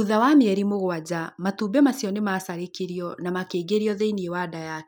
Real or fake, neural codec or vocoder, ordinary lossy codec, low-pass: real; none; none; none